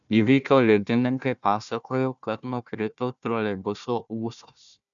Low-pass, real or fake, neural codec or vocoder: 7.2 kHz; fake; codec, 16 kHz, 1 kbps, FunCodec, trained on Chinese and English, 50 frames a second